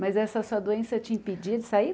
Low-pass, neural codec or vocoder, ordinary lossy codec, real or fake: none; none; none; real